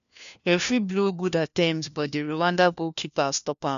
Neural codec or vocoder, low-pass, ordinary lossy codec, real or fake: codec, 16 kHz, 1 kbps, FunCodec, trained on LibriTTS, 50 frames a second; 7.2 kHz; none; fake